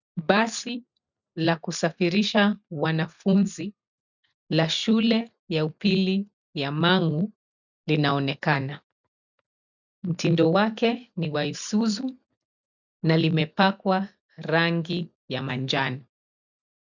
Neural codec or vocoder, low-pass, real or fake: none; 7.2 kHz; real